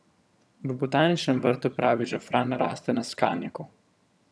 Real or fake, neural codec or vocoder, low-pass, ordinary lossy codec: fake; vocoder, 22.05 kHz, 80 mel bands, HiFi-GAN; none; none